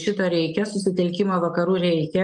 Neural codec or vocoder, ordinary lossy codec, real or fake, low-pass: none; Opus, 32 kbps; real; 10.8 kHz